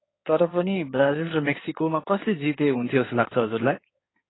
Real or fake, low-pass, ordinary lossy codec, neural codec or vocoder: fake; 7.2 kHz; AAC, 16 kbps; codec, 16 kHz in and 24 kHz out, 2.2 kbps, FireRedTTS-2 codec